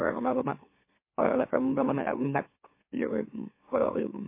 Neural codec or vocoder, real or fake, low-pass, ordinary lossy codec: autoencoder, 44.1 kHz, a latent of 192 numbers a frame, MeloTTS; fake; 3.6 kHz; MP3, 24 kbps